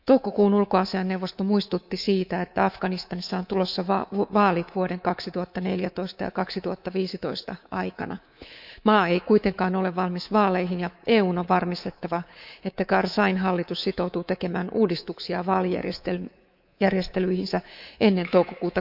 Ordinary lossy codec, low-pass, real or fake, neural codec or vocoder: none; 5.4 kHz; fake; codec, 24 kHz, 3.1 kbps, DualCodec